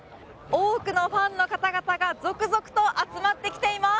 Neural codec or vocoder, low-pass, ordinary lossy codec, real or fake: none; none; none; real